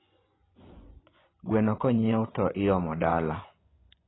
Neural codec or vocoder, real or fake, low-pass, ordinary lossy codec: vocoder, 24 kHz, 100 mel bands, Vocos; fake; 7.2 kHz; AAC, 16 kbps